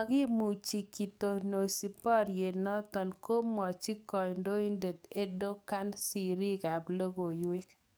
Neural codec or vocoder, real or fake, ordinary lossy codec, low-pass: codec, 44.1 kHz, 7.8 kbps, DAC; fake; none; none